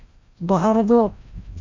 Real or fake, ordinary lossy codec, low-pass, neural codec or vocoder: fake; MP3, 48 kbps; 7.2 kHz; codec, 16 kHz, 0.5 kbps, FreqCodec, larger model